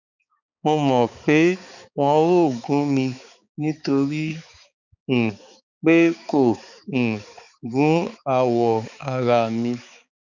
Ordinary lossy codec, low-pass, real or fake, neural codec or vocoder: none; 7.2 kHz; fake; codec, 16 kHz, 4 kbps, X-Codec, HuBERT features, trained on balanced general audio